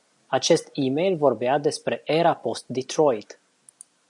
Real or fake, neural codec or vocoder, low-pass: real; none; 10.8 kHz